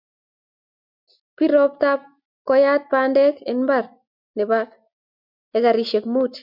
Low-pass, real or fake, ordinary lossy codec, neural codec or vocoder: 5.4 kHz; real; MP3, 48 kbps; none